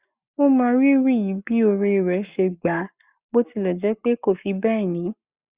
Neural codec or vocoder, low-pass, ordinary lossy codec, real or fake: codec, 44.1 kHz, 7.8 kbps, Pupu-Codec; 3.6 kHz; Opus, 64 kbps; fake